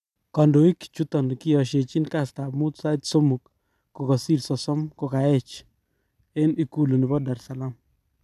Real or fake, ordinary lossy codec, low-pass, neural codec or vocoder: fake; none; 14.4 kHz; vocoder, 44.1 kHz, 128 mel bands every 256 samples, BigVGAN v2